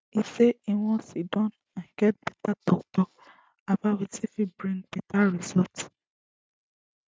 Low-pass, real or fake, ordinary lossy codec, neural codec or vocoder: none; real; none; none